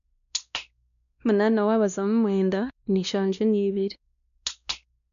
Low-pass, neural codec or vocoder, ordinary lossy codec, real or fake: 7.2 kHz; codec, 16 kHz, 1 kbps, X-Codec, WavLM features, trained on Multilingual LibriSpeech; none; fake